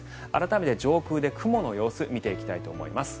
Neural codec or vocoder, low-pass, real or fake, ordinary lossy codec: none; none; real; none